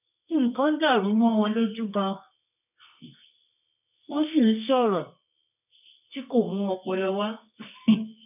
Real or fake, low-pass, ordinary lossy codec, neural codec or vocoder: fake; 3.6 kHz; none; codec, 24 kHz, 0.9 kbps, WavTokenizer, medium music audio release